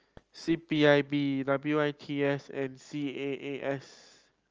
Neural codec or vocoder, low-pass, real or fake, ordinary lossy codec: none; 7.2 kHz; real; Opus, 24 kbps